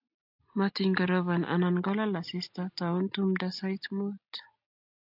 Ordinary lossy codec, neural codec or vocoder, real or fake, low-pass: AAC, 48 kbps; none; real; 5.4 kHz